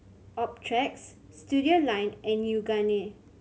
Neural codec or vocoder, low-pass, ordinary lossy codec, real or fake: none; none; none; real